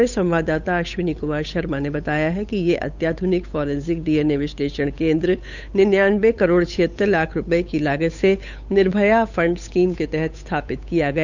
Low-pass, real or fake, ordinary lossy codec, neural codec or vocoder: 7.2 kHz; fake; none; codec, 16 kHz, 8 kbps, FunCodec, trained on Chinese and English, 25 frames a second